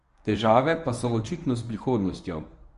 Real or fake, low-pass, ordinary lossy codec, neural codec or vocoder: fake; 10.8 kHz; none; codec, 24 kHz, 0.9 kbps, WavTokenizer, medium speech release version 1